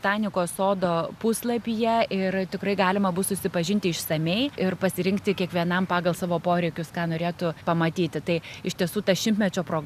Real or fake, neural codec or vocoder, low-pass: real; none; 14.4 kHz